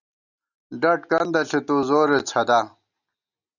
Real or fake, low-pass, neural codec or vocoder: real; 7.2 kHz; none